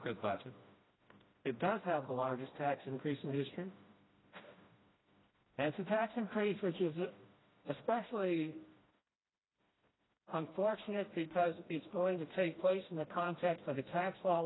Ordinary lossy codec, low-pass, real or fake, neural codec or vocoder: AAC, 16 kbps; 7.2 kHz; fake; codec, 16 kHz, 1 kbps, FreqCodec, smaller model